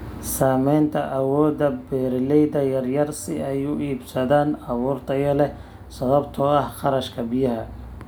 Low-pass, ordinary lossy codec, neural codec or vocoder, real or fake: none; none; none; real